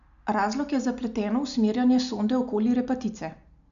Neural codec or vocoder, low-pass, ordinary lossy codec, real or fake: none; 7.2 kHz; MP3, 96 kbps; real